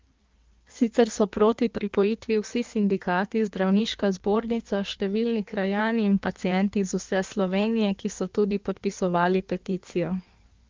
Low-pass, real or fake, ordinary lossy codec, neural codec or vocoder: 7.2 kHz; fake; Opus, 24 kbps; codec, 16 kHz in and 24 kHz out, 1.1 kbps, FireRedTTS-2 codec